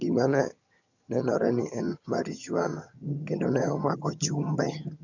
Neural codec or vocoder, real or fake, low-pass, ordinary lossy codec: vocoder, 22.05 kHz, 80 mel bands, HiFi-GAN; fake; 7.2 kHz; none